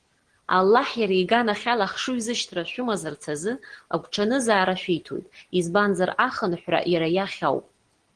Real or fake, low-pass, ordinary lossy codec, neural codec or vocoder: real; 9.9 kHz; Opus, 16 kbps; none